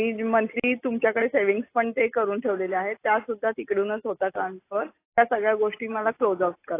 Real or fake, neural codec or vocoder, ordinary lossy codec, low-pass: real; none; AAC, 24 kbps; 3.6 kHz